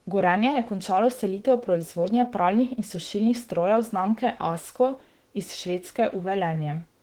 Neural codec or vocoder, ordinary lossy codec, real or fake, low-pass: autoencoder, 48 kHz, 32 numbers a frame, DAC-VAE, trained on Japanese speech; Opus, 16 kbps; fake; 19.8 kHz